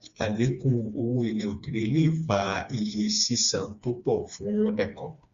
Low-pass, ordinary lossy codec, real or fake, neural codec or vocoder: 7.2 kHz; Opus, 64 kbps; fake; codec, 16 kHz, 2 kbps, FreqCodec, smaller model